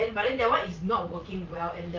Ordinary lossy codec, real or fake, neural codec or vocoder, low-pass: Opus, 32 kbps; fake; vocoder, 44.1 kHz, 80 mel bands, Vocos; 7.2 kHz